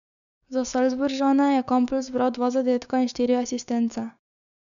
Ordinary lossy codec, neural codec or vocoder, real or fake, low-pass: none; codec, 16 kHz, 6 kbps, DAC; fake; 7.2 kHz